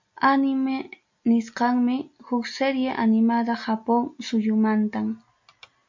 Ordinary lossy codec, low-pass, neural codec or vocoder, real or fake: MP3, 64 kbps; 7.2 kHz; none; real